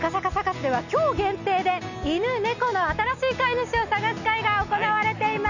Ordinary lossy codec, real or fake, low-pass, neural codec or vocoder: none; real; 7.2 kHz; none